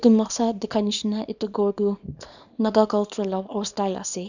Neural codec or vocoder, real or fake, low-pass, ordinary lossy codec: codec, 24 kHz, 0.9 kbps, WavTokenizer, small release; fake; 7.2 kHz; none